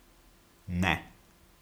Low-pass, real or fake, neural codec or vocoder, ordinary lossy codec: none; real; none; none